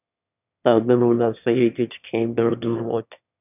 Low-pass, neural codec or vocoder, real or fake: 3.6 kHz; autoencoder, 22.05 kHz, a latent of 192 numbers a frame, VITS, trained on one speaker; fake